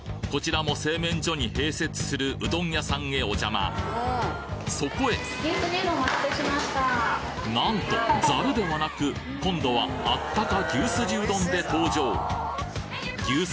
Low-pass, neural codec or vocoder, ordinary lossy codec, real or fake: none; none; none; real